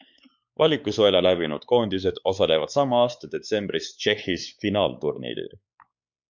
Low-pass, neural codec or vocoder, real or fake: 7.2 kHz; codec, 16 kHz, 4 kbps, X-Codec, WavLM features, trained on Multilingual LibriSpeech; fake